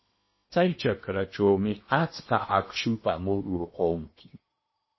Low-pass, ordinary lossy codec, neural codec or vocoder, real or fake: 7.2 kHz; MP3, 24 kbps; codec, 16 kHz in and 24 kHz out, 0.8 kbps, FocalCodec, streaming, 65536 codes; fake